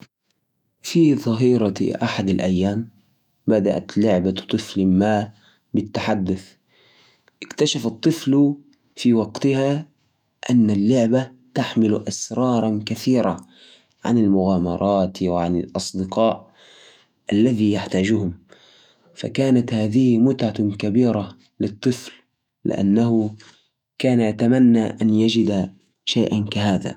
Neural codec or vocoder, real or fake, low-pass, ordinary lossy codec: autoencoder, 48 kHz, 128 numbers a frame, DAC-VAE, trained on Japanese speech; fake; 19.8 kHz; none